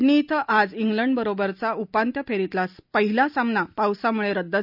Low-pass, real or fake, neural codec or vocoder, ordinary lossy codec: 5.4 kHz; real; none; none